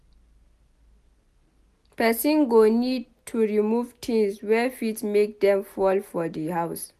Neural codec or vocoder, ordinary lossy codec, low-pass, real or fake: none; none; 14.4 kHz; real